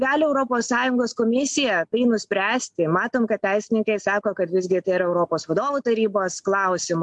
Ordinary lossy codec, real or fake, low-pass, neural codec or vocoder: MP3, 64 kbps; real; 10.8 kHz; none